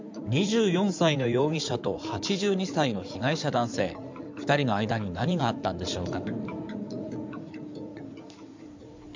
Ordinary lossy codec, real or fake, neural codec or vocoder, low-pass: MP3, 64 kbps; fake; codec, 16 kHz in and 24 kHz out, 2.2 kbps, FireRedTTS-2 codec; 7.2 kHz